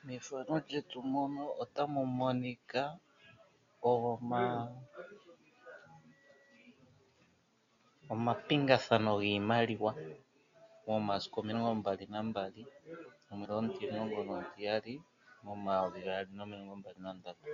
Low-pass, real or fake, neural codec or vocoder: 7.2 kHz; real; none